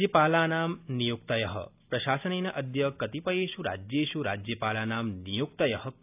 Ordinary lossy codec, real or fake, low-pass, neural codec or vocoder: AAC, 32 kbps; real; 3.6 kHz; none